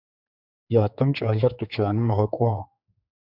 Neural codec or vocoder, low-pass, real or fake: codec, 16 kHz, 4 kbps, X-Codec, HuBERT features, trained on general audio; 5.4 kHz; fake